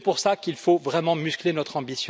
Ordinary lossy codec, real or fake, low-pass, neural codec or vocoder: none; real; none; none